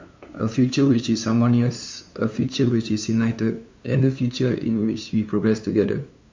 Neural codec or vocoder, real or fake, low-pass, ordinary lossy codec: codec, 16 kHz, 2 kbps, FunCodec, trained on LibriTTS, 25 frames a second; fake; 7.2 kHz; MP3, 64 kbps